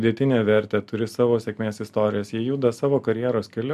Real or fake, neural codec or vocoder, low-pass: real; none; 14.4 kHz